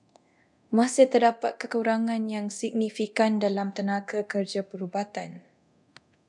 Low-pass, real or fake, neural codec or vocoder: 10.8 kHz; fake; codec, 24 kHz, 0.9 kbps, DualCodec